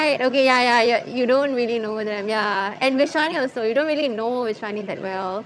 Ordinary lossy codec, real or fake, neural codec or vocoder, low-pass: none; fake; vocoder, 22.05 kHz, 80 mel bands, HiFi-GAN; none